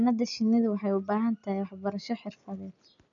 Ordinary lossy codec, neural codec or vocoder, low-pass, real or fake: none; none; 7.2 kHz; real